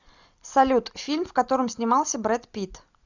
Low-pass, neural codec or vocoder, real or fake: 7.2 kHz; none; real